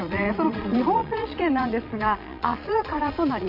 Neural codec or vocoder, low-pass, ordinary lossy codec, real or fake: vocoder, 22.05 kHz, 80 mel bands, Vocos; 5.4 kHz; none; fake